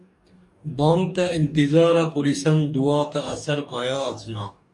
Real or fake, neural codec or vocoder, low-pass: fake; codec, 44.1 kHz, 2.6 kbps, DAC; 10.8 kHz